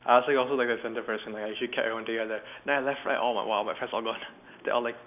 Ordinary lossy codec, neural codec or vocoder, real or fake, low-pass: none; none; real; 3.6 kHz